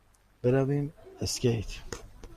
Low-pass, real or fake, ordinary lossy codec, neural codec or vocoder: 14.4 kHz; real; Opus, 64 kbps; none